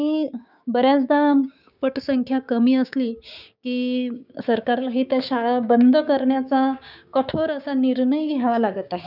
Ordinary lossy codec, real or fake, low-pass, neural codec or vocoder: none; fake; 5.4 kHz; codec, 16 kHz, 6 kbps, DAC